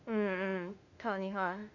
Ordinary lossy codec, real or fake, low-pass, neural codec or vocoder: none; fake; 7.2 kHz; autoencoder, 48 kHz, 32 numbers a frame, DAC-VAE, trained on Japanese speech